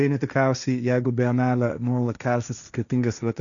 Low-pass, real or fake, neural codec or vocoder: 7.2 kHz; fake; codec, 16 kHz, 1.1 kbps, Voila-Tokenizer